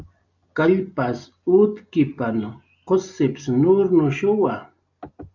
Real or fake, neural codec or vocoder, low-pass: real; none; 7.2 kHz